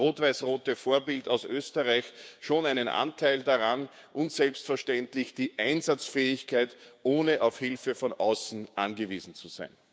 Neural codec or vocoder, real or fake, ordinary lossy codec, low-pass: codec, 16 kHz, 6 kbps, DAC; fake; none; none